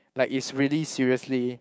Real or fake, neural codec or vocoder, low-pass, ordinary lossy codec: fake; codec, 16 kHz, 6 kbps, DAC; none; none